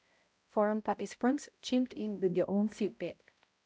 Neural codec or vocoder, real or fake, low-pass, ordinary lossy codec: codec, 16 kHz, 0.5 kbps, X-Codec, HuBERT features, trained on balanced general audio; fake; none; none